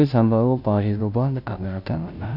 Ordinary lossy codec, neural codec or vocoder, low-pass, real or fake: none; codec, 16 kHz, 0.5 kbps, FunCodec, trained on Chinese and English, 25 frames a second; 5.4 kHz; fake